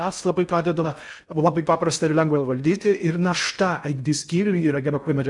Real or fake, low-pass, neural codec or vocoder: fake; 10.8 kHz; codec, 16 kHz in and 24 kHz out, 0.6 kbps, FocalCodec, streaming, 4096 codes